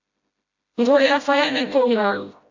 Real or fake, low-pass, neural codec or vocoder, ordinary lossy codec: fake; 7.2 kHz; codec, 16 kHz, 0.5 kbps, FreqCodec, smaller model; AAC, 48 kbps